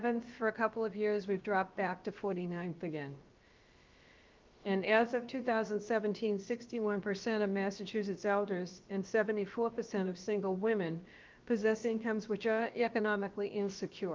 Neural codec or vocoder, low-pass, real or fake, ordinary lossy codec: codec, 16 kHz, about 1 kbps, DyCAST, with the encoder's durations; 7.2 kHz; fake; Opus, 32 kbps